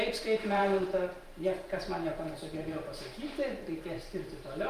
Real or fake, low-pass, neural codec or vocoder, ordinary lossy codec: fake; 14.4 kHz; vocoder, 44.1 kHz, 128 mel bands every 512 samples, BigVGAN v2; Opus, 32 kbps